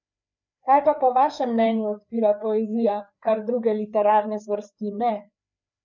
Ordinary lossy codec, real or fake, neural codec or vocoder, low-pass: none; fake; codec, 16 kHz, 4 kbps, FreqCodec, larger model; 7.2 kHz